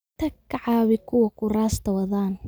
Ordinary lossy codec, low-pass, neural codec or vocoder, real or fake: none; none; none; real